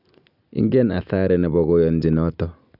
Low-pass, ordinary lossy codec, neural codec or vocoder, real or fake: 5.4 kHz; none; none; real